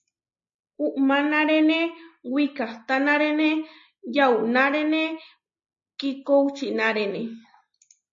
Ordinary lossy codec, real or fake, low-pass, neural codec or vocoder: MP3, 32 kbps; real; 7.2 kHz; none